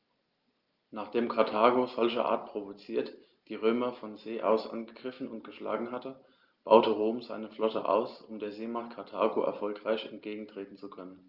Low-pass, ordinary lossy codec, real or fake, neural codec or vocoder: 5.4 kHz; Opus, 24 kbps; real; none